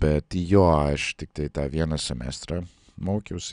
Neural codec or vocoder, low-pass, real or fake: none; 9.9 kHz; real